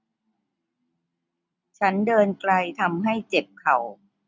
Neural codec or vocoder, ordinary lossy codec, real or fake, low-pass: none; none; real; none